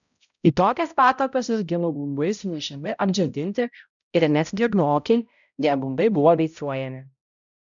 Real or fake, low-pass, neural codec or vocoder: fake; 7.2 kHz; codec, 16 kHz, 0.5 kbps, X-Codec, HuBERT features, trained on balanced general audio